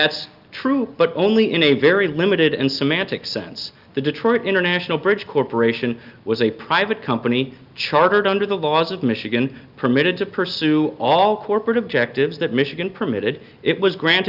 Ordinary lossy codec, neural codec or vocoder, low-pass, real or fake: Opus, 24 kbps; none; 5.4 kHz; real